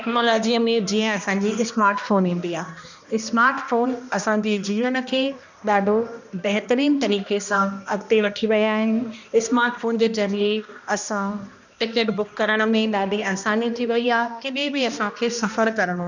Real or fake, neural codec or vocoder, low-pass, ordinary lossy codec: fake; codec, 16 kHz, 1 kbps, X-Codec, HuBERT features, trained on balanced general audio; 7.2 kHz; none